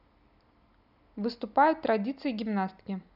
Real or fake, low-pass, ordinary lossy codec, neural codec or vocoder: real; 5.4 kHz; none; none